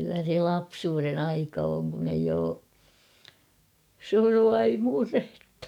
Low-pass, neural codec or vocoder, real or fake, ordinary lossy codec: 19.8 kHz; codec, 44.1 kHz, 7.8 kbps, DAC; fake; none